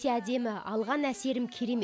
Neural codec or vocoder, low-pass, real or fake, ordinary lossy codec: none; none; real; none